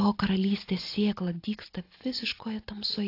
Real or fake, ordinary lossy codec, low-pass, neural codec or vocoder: real; AAC, 32 kbps; 5.4 kHz; none